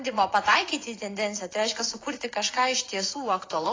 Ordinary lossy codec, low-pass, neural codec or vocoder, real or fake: AAC, 32 kbps; 7.2 kHz; vocoder, 24 kHz, 100 mel bands, Vocos; fake